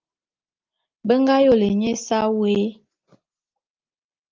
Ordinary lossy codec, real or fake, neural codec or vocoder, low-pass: Opus, 32 kbps; real; none; 7.2 kHz